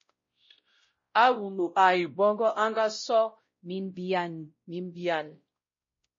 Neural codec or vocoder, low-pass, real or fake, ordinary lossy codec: codec, 16 kHz, 0.5 kbps, X-Codec, WavLM features, trained on Multilingual LibriSpeech; 7.2 kHz; fake; MP3, 32 kbps